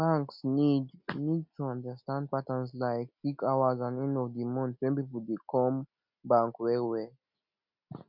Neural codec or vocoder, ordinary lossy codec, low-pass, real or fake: none; none; 5.4 kHz; real